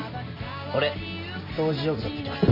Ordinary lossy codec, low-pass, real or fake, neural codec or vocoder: MP3, 48 kbps; 5.4 kHz; real; none